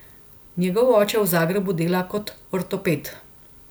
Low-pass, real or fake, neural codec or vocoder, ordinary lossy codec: none; real; none; none